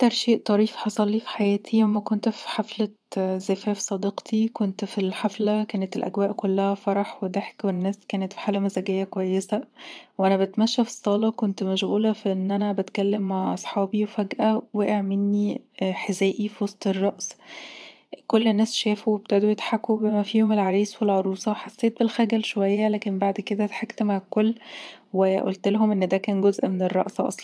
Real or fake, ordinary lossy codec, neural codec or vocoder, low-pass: fake; none; vocoder, 22.05 kHz, 80 mel bands, Vocos; none